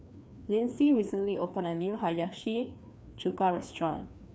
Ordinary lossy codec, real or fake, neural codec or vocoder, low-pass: none; fake; codec, 16 kHz, 2 kbps, FreqCodec, larger model; none